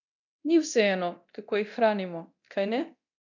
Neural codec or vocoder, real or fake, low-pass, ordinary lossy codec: codec, 24 kHz, 0.9 kbps, DualCodec; fake; 7.2 kHz; none